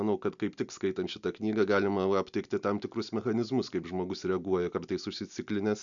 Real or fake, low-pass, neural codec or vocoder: real; 7.2 kHz; none